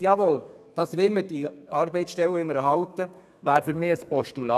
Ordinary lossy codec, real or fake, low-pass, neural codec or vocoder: none; fake; 14.4 kHz; codec, 32 kHz, 1.9 kbps, SNAC